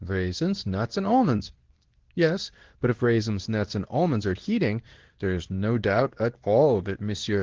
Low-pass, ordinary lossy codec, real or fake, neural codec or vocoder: 7.2 kHz; Opus, 16 kbps; fake; codec, 24 kHz, 0.9 kbps, WavTokenizer, medium speech release version 2